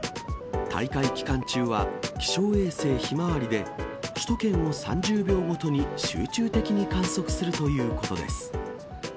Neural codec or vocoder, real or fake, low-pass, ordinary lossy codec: none; real; none; none